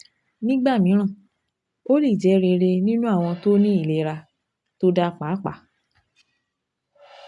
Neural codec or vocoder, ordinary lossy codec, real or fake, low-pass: none; AAC, 64 kbps; real; 10.8 kHz